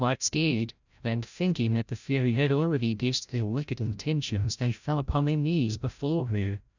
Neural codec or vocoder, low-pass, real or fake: codec, 16 kHz, 0.5 kbps, FreqCodec, larger model; 7.2 kHz; fake